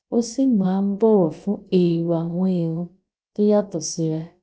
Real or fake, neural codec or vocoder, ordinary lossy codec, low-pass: fake; codec, 16 kHz, about 1 kbps, DyCAST, with the encoder's durations; none; none